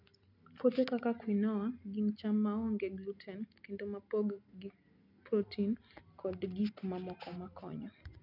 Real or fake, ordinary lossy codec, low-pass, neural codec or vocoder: real; none; 5.4 kHz; none